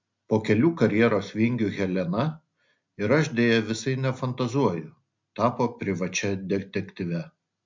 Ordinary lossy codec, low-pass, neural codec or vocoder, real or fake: MP3, 64 kbps; 7.2 kHz; none; real